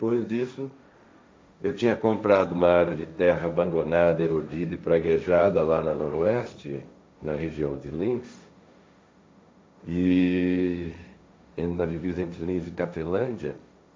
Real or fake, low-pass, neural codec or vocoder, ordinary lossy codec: fake; none; codec, 16 kHz, 1.1 kbps, Voila-Tokenizer; none